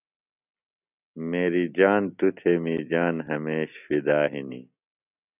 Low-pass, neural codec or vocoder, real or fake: 3.6 kHz; none; real